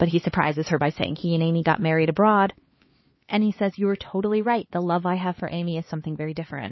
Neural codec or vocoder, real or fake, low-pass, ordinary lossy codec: codec, 16 kHz, 4 kbps, X-Codec, HuBERT features, trained on LibriSpeech; fake; 7.2 kHz; MP3, 24 kbps